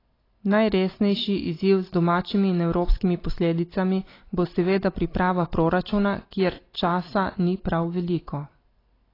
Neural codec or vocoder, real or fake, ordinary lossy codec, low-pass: none; real; AAC, 24 kbps; 5.4 kHz